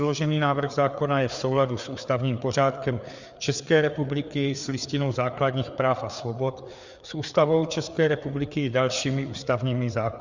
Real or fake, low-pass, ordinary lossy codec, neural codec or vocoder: fake; 7.2 kHz; Opus, 64 kbps; codec, 16 kHz, 4 kbps, FreqCodec, larger model